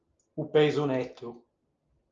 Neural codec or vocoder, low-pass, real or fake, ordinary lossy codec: none; 7.2 kHz; real; Opus, 24 kbps